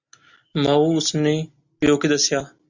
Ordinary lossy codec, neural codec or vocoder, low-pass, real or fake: Opus, 64 kbps; none; 7.2 kHz; real